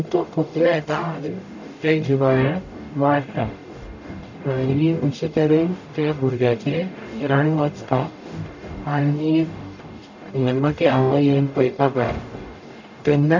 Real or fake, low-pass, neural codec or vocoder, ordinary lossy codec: fake; 7.2 kHz; codec, 44.1 kHz, 0.9 kbps, DAC; none